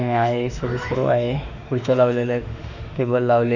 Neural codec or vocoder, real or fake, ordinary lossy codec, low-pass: autoencoder, 48 kHz, 32 numbers a frame, DAC-VAE, trained on Japanese speech; fake; none; 7.2 kHz